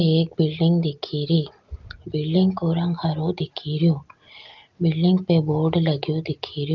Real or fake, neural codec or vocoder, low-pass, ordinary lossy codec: real; none; 7.2 kHz; Opus, 24 kbps